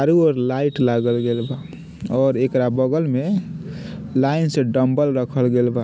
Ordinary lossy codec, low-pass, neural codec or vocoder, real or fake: none; none; none; real